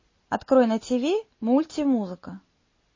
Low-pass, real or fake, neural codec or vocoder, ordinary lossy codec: 7.2 kHz; real; none; MP3, 32 kbps